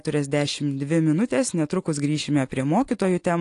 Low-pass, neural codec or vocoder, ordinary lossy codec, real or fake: 10.8 kHz; none; AAC, 48 kbps; real